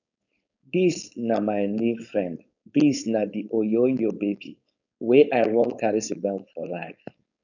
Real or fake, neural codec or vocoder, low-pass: fake; codec, 16 kHz, 4.8 kbps, FACodec; 7.2 kHz